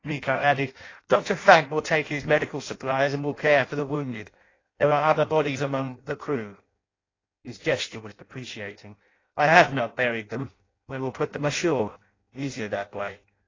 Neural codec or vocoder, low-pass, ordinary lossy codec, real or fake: codec, 16 kHz in and 24 kHz out, 0.6 kbps, FireRedTTS-2 codec; 7.2 kHz; AAC, 32 kbps; fake